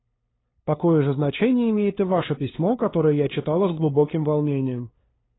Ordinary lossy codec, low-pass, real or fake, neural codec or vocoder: AAC, 16 kbps; 7.2 kHz; fake; codec, 16 kHz, 2 kbps, FunCodec, trained on LibriTTS, 25 frames a second